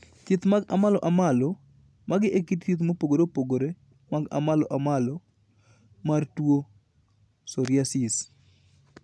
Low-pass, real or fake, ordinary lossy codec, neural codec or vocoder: none; real; none; none